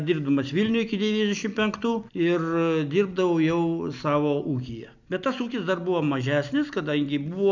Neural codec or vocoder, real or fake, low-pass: none; real; 7.2 kHz